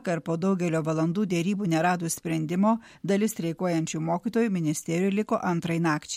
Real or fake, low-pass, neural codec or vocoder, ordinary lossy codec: real; 19.8 kHz; none; MP3, 64 kbps